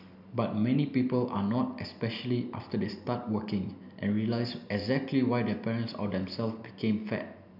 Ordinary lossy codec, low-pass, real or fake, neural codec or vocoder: none; 5.4 kHz; real; none